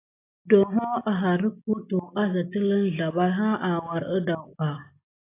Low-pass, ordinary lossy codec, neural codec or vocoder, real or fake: 3.6 kHz; AAC, 24 kbps; none; real